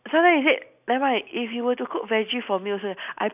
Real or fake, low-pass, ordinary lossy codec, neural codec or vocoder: real; 3.6 kHz; none; none